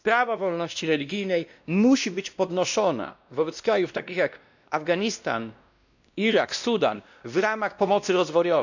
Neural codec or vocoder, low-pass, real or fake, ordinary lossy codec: codec, 16 kHz, 1 kbps, X-Codec, WavLM features, trained on Multilingual LibriSpeech; 7.2 kHz; fake; none